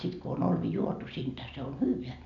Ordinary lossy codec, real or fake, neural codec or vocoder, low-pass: none; real; none; 7.2 kHz